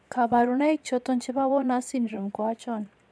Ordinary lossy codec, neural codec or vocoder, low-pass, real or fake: none; vocoder, 22.05 kHz, 80 mel bands, Vocos; none; fake